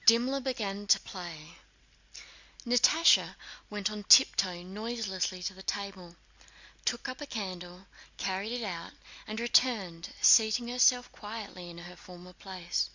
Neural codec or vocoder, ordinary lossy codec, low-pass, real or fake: none; Opus, 64 kbps; 7.2 kHz; real